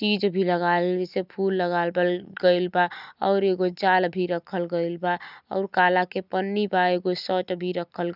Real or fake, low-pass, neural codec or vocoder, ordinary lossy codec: real; 5.4 kHz; none; none